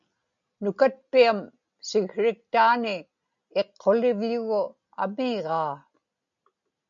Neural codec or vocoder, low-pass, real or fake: none; 7.2 kHz; real